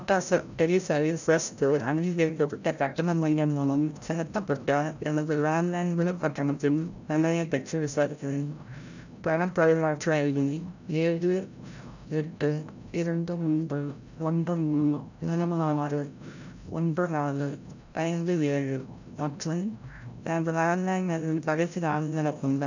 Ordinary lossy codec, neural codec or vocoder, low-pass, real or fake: none; codec, 16 kHz, 0.5 kbps, FreqCodec, larger model; 7.2 kHz; fake